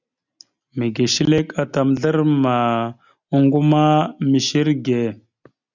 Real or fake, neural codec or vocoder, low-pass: real; none; 7.2 kHz